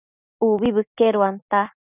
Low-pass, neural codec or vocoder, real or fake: 3.6 kHz; none; real